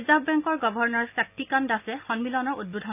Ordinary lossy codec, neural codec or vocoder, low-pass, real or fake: none; none; 3.6 kHz; real